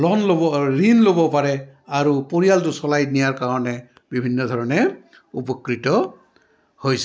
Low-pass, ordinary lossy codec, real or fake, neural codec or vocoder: none; none; real; none